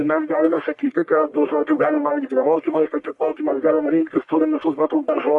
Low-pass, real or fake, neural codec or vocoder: 10.8 kHz; fake; codec, 44.1 kHz, 1.7 kbps, Pupu-Codec